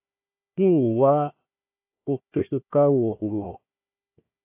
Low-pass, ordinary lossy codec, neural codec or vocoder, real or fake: 3.6 kHz; AAC, 32 kbps; codec, 16 kHz, 1 kbps, FunCodec, trained on Chinese and English, 50 frames a second; fake